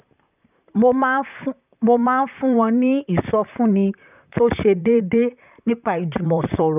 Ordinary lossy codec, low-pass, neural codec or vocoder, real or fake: none; 3.6 kHz; vocoder, 44.1 kHz, 128 mel bands, Pupu-Vocoder; fake